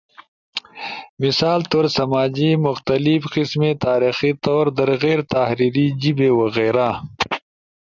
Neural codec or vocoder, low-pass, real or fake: none; 7.2 kHz; real